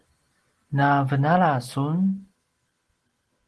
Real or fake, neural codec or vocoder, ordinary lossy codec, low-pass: real; none; Opus, 16 kbps; 10.8 kHz